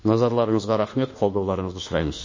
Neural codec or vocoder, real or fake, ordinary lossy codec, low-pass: autoencoder, 48 kHz, 32 numbers a frame, DAC-VAE, trained on Japanese speech; fake; MP3, 32 kbps; 7.2 kHz